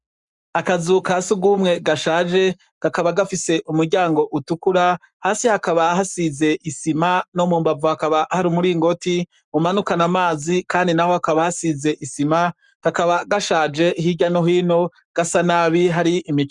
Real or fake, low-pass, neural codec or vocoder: fake; 10.8 kHz; codec, 44.1 kHz, 7.8 kbps, Pupu-Codec